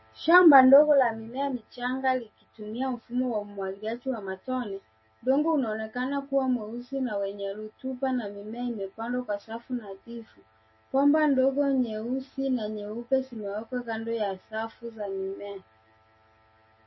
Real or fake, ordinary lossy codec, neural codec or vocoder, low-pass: real; MP3, 24 kbps; none; 7.2 kHz